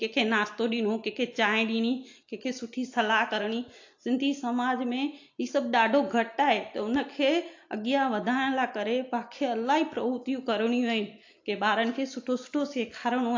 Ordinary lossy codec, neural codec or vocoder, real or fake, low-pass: AAC, 48 kbps; none; real; 7.2 kHz